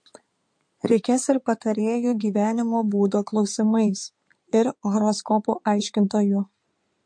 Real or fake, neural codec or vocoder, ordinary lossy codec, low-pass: fake; codec, 16 kHz in and 24 kHz out, 2.2 kbps, FireRedTTS-2 codec; MP3, 48 kbps; 9.9 kHz